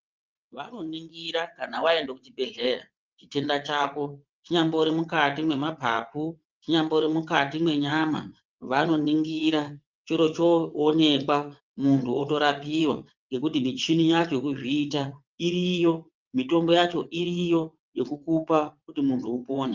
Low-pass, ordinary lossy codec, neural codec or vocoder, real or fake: 7.2 kHz; Opus, 24 kbps; vocoder, 22.05 kHz, 80 mel bands, WaveNeXt; fake